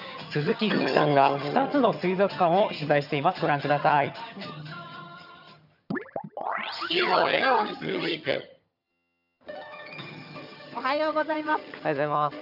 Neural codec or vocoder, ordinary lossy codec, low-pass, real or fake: vocoder, 22.05 kHz, 80 mel bands, HiFi-GAN; none; 5.4 kHz; fake